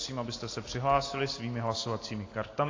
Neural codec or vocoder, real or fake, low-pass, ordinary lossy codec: none; real; 7.2 kHz; AAC, 32 kbps